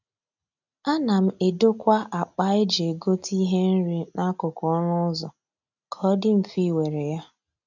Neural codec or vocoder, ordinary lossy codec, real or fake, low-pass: none; none; real; 7.2 kHz